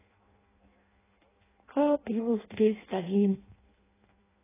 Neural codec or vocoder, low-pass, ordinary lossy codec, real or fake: codec, 16 kHz in and 24 kHz out, 0.6 kbps, FireRedTTS-2 codec; 3.6 kHz; AAC, 16 kbps; fake